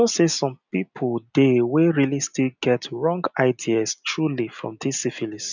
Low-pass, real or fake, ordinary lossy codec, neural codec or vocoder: 7.2 kHz; real; none; none